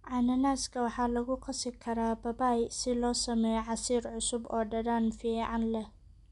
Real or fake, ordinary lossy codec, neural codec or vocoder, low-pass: real; none; none; 10.8 kHz